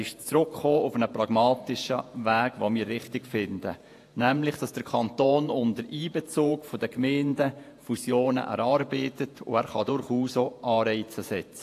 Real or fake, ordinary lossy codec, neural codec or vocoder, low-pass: fake; AAC, 64 kbps; vocoder, 48 kHz, 128 mel bands, Vocos; 14.4 kHz